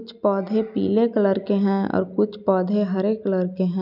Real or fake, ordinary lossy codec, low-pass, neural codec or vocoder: real; none; 5.4 kHz; none